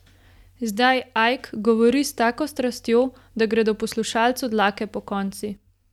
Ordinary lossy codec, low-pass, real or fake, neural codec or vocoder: none; 19.8 kHz; real; none